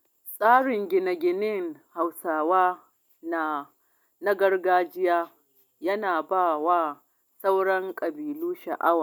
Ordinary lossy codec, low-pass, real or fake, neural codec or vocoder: none; 19.8 kHz; real; none